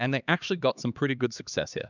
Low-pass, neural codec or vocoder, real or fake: 7.2 kHz; codec, 16 kHz, 2 kbps, X-Codec, HuBERT features, trained on LibriSpeech; fake